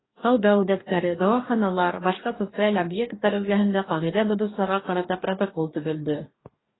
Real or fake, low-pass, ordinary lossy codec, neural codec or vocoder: fake; 7.2 kHz; AAC, 16 kbps; codec, 44.1 kHz, 2.6 kbps, DAC